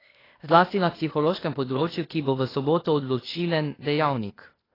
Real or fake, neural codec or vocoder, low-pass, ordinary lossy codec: fake; codec, 16 kHz, 0.8 kbps, ZipCodec; 5.4 kHz; AAC, 24 kbps